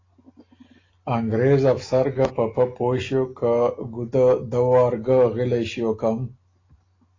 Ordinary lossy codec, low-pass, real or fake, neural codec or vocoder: AAC, 32 kbps; 7.2 kHz; real; none